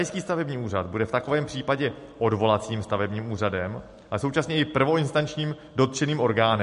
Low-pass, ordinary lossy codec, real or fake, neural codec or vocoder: 14.4 kHz; MP3, 48 kbps; real; none